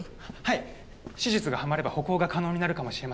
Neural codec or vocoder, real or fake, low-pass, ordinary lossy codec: none; real; none; none